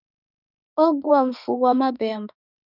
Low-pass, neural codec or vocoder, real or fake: 5.4 kHz; autoencoder, 48 kHz, 32 numbers a frame, DAC-VAE, trained on Japanese speech; fake